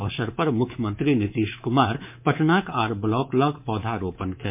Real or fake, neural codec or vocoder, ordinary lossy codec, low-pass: fake; codec, 24 kHz, 3.1 kbps, DualCodec; MP3, 32 kbps; 3.6 kHz